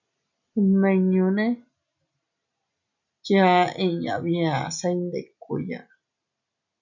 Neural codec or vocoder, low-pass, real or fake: none; 7.2 kHz; real